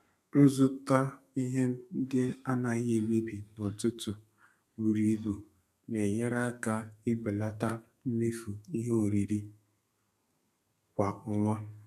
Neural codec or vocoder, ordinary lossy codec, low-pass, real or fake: codec, 32 kHz, 1.9 kbps, SNAC; none; 14.4 kHz; fake